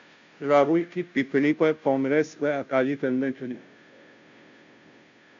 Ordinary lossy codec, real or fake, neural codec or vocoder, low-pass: MP3, 64 kbps; fake; codec, 16 kHz, 0.5 kbps, FunCodec, trained on Chinese and English, 25 frames a second; 7.2 kHz